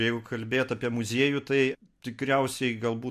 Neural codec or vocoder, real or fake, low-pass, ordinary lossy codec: none; real; 14.4 kHz; MP3, 64 kbps